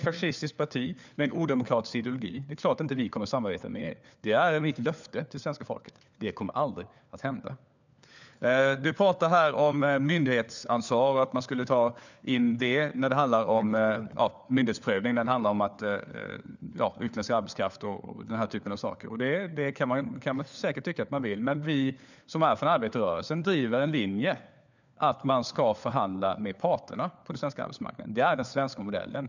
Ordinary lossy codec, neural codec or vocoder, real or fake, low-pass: none; codec, 16 kHz, 4 kbps, FunCodec, trained on LibriTTS, 50 frames a second; fake; 7.2 kHz